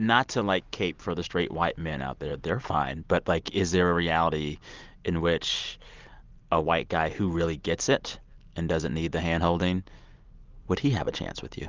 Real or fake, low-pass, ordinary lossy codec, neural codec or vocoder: real; 7.2 kHz; Opus, 24 kbps; none